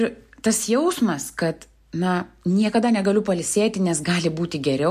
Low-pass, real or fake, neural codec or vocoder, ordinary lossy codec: 14.4 kHz; real; none; MP3, 64 kbps